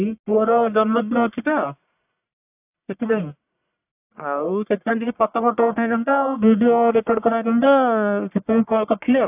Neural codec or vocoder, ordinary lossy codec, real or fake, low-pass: codec, 44.1 kHz, 1.7 kbps, Pupu-Codec; AAC, 32 kbps; fake; 3.6 kHz